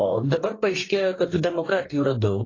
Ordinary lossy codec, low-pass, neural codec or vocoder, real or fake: AAC, 32 kbps; 7.2 kHz; codec, 44.1 kHz, 2.6 kbps, DAC; fake